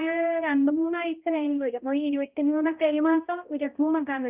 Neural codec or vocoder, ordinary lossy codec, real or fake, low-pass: codec, 16 kHz, 0.5 kbps, X-Codec, HuBERT features, trained on balanced general audio; Opus, 32 kbps; fake; 3.6 kHz